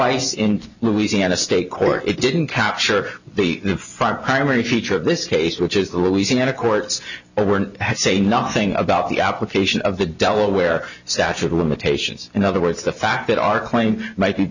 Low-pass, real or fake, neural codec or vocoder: 7.2 kHz; real; none